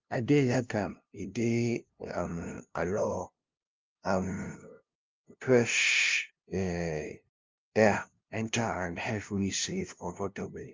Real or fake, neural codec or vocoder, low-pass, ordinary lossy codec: fake; codec, 16 kHz, 0.5 kbps, FunCodec, trained on LibriTTS, 25 frames a second; 7.2 kHz; Opus, 24 kbps